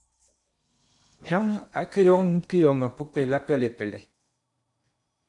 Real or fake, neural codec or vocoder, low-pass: fake; codec, 16 kHz in and 24 kHz out, 0.8 kbps, FocalCodec, streaming, 65536 codes; 10.8 kHz